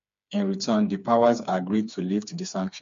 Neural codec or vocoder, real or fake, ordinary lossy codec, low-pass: codec, 16 kHz, 4 kbps, FreqCodec, smaller model; fake; MP3, 48 kbps; 7.2 kHz